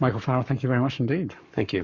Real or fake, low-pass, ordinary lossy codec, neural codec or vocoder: fake; 7.2 kHz; Opus, 64 kbps; codec, 44.1 kHz, 7.8 kbps, Pupu-Codec